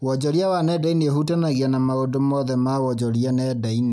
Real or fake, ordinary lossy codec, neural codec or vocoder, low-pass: real; none; none; none